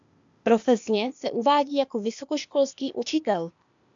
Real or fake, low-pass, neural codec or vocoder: fake; 7.2 kHz; codec, 16 kHz, 0.8 kbps, ZipCodec